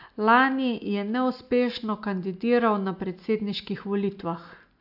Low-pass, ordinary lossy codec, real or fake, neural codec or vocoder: 5.4 kHz; AAC, 48 kbps; real; none